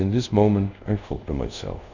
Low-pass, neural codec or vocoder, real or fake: 7.2 kHz; codec, 24 kHz, 0.5 kbps, DualCodec; fake